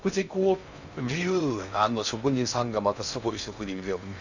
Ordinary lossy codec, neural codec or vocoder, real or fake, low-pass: none; codec, 16 kHz in and 24 kHz out, 0.6 kbps, FocalCodec, streaming, 2048 codes; fake; 7.2 kHz